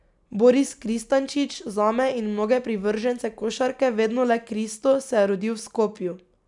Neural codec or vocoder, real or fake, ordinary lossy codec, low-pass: none; real; none; 10.8 kHz